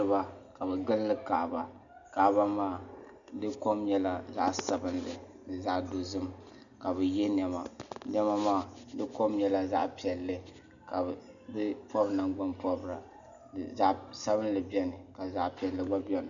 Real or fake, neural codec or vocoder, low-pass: real; none; 7.2 kHz